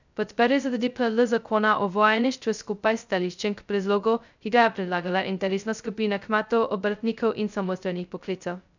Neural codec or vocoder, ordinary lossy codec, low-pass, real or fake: codec, 16 kHz, 0.2 kbps, FocalCodec; none; 7.2 kHz; fake